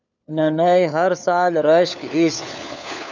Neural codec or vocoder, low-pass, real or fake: codec, 16 kHz, 4 kbps, FunCodec, trained on LibriTTS, 50 frames a second; 7.2 kHz; fake